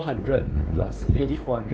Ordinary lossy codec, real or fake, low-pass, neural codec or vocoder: none; fake; none; codec, 16 kHz, 2 kbps, X-Codec, WavLM features, trained on Multilingual LibriSpeech